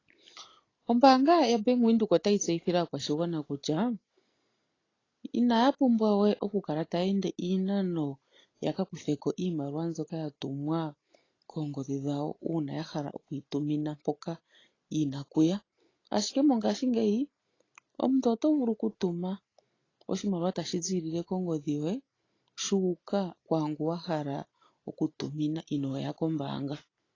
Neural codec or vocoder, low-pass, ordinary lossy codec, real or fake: none; 7.2 kHz; AAC, 32 kbps; real